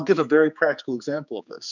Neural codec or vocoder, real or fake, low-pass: codec, 16 kHz, 4 kbps, X-Codec, HuBERT features, trained on general audio; fake; 7.2 kHz